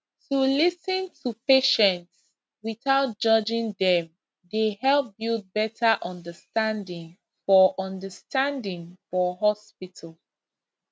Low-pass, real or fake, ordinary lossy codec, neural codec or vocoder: none; real; none; none